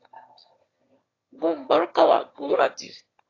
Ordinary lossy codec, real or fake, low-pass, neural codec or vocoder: AAC, 32 kbps; fake; 7.2 kHz; autoencoder, 22.05 kHz, a latent of 192 numbers a frame, VITS, trained on one speaker